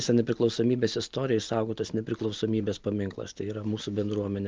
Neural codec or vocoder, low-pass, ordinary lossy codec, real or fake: none; 7.2 kHz; Opus, 32 kbps; real